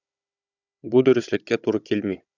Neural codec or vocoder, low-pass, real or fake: codec, 16 kHz, 16 kbps, FunCodec, trained on Chinese and English, 50 frames a second; 7.2 kHz; fake